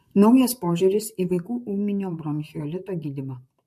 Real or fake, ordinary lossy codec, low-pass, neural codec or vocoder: fake; MP3, 64 kbps; 14.4 kHz; vocoder, 44.1 kHz, 128 mel bands, Pupu-Vocoder